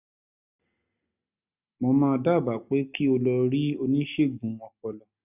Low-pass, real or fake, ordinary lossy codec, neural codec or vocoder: 3.6 kHz; real; none; none